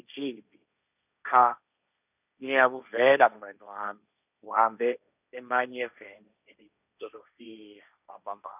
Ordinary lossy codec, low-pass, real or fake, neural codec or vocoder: none; 3.6 kHz; fake; codec, 16 kHz, 1.1 kbps, Voila-Tokenizer